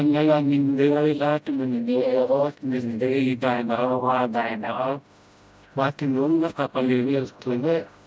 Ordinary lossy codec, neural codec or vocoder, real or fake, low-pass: none; codec, 16 kHz, 0.5 kbps, FreqCodec, smaller model; fake; none